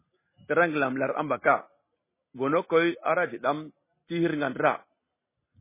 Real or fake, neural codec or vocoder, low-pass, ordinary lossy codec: real; none; 3.6 kHz; MP3, 16 kbps